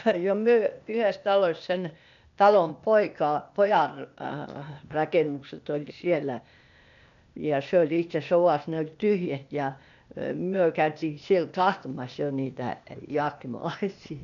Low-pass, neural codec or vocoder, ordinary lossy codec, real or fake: 7.2 kHz; codec, 16 kHz, 0.8 kbps, ZipCodec; none; fake